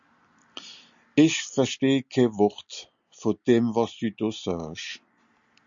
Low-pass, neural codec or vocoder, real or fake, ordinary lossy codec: 7.2 kHz; none; real; Opus, 64 kbps